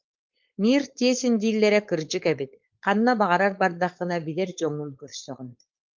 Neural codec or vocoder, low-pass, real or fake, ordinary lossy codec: codec, 16 kHz, 4.8 kbps, FACodec; 7.2 kHz; fake; Opus, 24 kbps